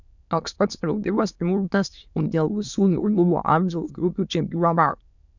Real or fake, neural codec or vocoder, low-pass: fake; autoencoder, 22.05 kHz, a latent of 192 numbers a frame, VITS, trained on many speakers; 7.2 kHz